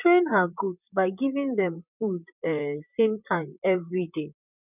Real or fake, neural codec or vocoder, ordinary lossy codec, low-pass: fake; vocoder, 44.1 kHz, 128 mel bands, Pupu-Vocoder; none; 3.6 kHz